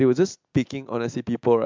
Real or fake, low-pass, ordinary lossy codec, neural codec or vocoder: real; 7.2 kHz; MP3, 64 kbps; none